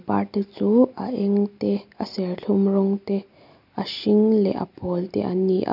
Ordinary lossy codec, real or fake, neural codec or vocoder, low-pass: none; real; none; 5.4 kHz